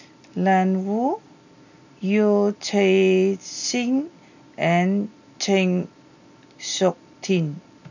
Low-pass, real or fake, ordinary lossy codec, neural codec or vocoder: 7.2 kHz; real; none; none